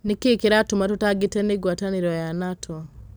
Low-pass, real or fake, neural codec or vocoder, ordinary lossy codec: none; real; none; none